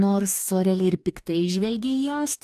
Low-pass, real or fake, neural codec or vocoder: 14.4 kHz; fake; codec, 44.1 kHz, 2.6 kbps, DAC